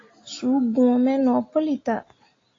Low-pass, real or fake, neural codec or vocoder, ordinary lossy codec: 7.2 kHz; real; none; AAC, 32 kbps